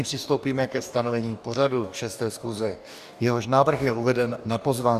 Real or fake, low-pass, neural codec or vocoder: fake; 14.4 kHz; codec, 44.1 kHz, 2.6 kbps, DAC